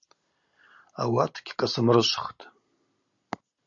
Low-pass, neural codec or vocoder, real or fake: 7.2 kHz; none; real